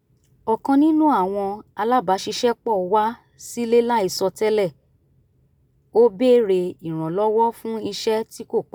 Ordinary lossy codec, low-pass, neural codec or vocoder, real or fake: none; none; none; real